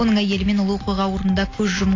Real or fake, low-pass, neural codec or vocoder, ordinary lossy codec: real; 7.2 kHz; none; AAC, 32 kbps